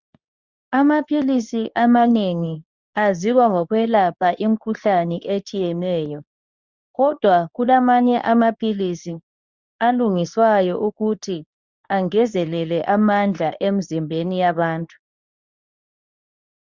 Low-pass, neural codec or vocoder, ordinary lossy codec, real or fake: 7.2 kHz; codec, 24 kHz, 0.9 kbps, WavTokenizer, medium speech release version 2; Opus, 64 kbps; fake